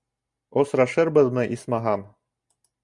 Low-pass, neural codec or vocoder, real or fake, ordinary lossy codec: 10.8 kHz; none; real; Opus, 64 kbps